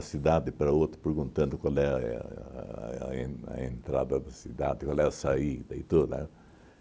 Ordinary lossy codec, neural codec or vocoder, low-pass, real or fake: none; none; none; real